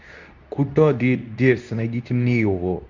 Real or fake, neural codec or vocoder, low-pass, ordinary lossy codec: fake; codec, 24 kHz, 0.9 kbps, WavTokenizer, medium speech release version 2; 7.2 kHz; Opus, 64 kbps